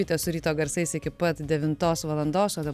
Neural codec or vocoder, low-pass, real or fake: none; 14.4 kHz; real